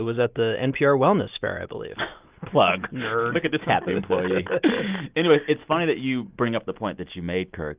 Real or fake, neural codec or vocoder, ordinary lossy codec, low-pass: real; none; Opus, 32 kbps; 3.6 kHz